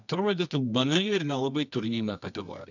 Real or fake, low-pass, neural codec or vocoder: fake; 7.2 kHz; codec, 24 kHz, 0.9 kbps, WavTokenizer, medium music audio release